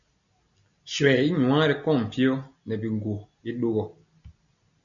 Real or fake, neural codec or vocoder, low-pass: real; none; 7.2 kHz